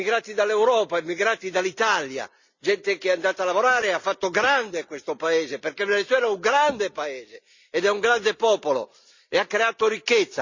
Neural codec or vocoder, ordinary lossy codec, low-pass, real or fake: none; Opus, 64 kbps; 7.2 kHz; real